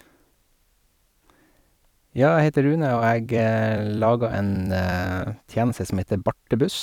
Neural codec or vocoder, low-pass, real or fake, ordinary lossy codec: vocoder, 44.1 kHz, 128 mel bands every 256 samples, BigVGAN v2; 19.8 kHz; fake; none